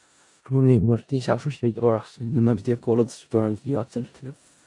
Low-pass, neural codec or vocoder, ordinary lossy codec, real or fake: 10.8 kHz; codec, 16 kHz in and 24 kHz out, 0.4 kbps, LongCat-Audio-Codec, four codebook decoder; AAC, 64 kbps; fake